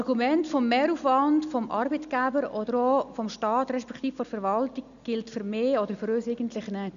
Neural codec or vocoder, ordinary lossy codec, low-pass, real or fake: none; none; 7.2 kHz; real